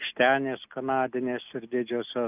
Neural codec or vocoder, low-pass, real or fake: none; 3.6 kHz; real